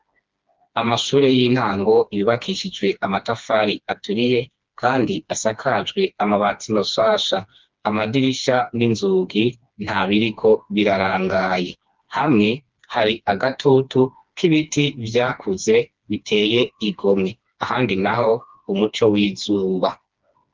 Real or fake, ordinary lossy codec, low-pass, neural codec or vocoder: fake; Opus, 32 kbps; 7.2 kHz; codec, 16 kHz, 2 kbps, FreqCodec, smaller model